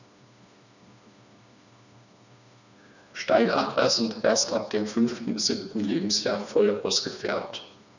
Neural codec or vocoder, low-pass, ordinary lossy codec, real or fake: codec, 16 kHz, 2 kbps, FreqCodec, smaller model; 7.2 kHz; none; fake